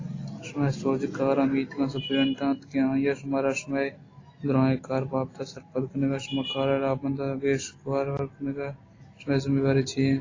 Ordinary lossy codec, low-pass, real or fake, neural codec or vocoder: AAC, 32 kbps; 7.2 kHz; real; none